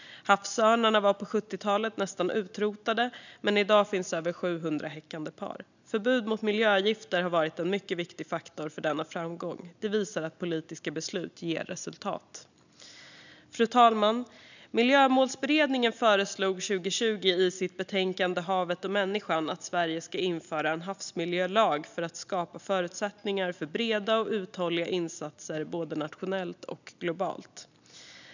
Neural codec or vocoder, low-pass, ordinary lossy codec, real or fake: none; 7.2 kHz; none; real